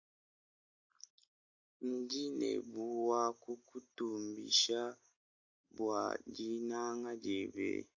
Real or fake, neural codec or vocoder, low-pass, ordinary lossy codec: real; none; 7.2 kHz; AAC, 48 kbps